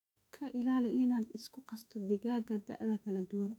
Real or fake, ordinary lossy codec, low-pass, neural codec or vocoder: fake; none; 19.8 kHz; autoencoder, 48 kHz, 32 numbers a frame, DAC-VAE, trained on Japanese speech